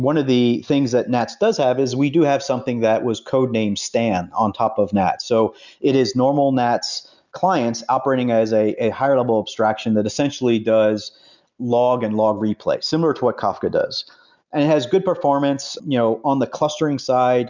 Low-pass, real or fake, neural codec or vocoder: 7.2 kHz; real; none